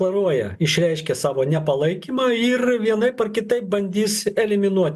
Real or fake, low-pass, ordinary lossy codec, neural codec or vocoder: real; 14.4 kHz; MP3, 96 kbps; none